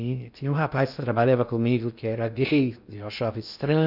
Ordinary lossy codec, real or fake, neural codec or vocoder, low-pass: none; fake; codec, 16 kHz in and 24 kHz out, 0.6 kbps, FocalCodec, streaming, 2048 codes; 5.4 kHz